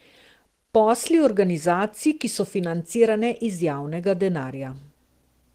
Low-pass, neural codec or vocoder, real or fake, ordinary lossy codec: 14.4 kHz; none; real; Opus, 16 kbps